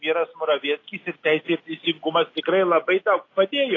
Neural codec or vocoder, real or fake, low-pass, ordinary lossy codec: none; real; 7.2 kHz; AAC, 32 kbps